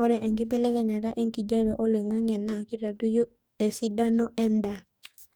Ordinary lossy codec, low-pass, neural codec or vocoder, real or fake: none; none; codec, 44.1 kHz, 2.6 kbps, DAC; fake